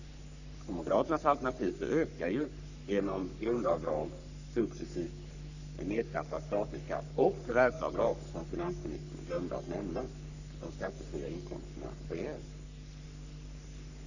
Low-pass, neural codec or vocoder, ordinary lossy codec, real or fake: 7.2 kHz; codec, 44.1 kHz, 3.4 kbps, Pupu-Codec; none; fake